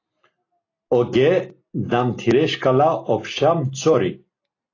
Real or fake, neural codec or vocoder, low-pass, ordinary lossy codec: real; none; 7.2 kHz; AAC, 32 kbps